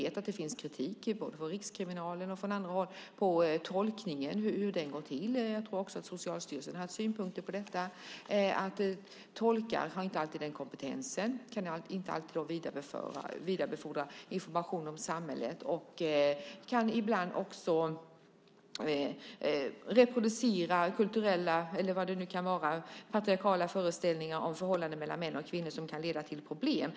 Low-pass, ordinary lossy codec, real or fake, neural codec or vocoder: none; none; real; none